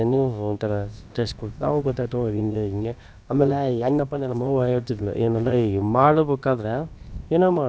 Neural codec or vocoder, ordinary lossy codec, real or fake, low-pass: codec, 16 kHz, about 1 kbps, DyCAST, with the encoder's durations; none; fake; none